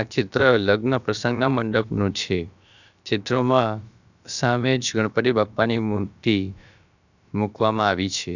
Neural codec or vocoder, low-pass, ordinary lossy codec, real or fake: codec, 16 kHz, about 1 kbps, DyCAST, with the encoder's durations; 7.2 kHz; none; fake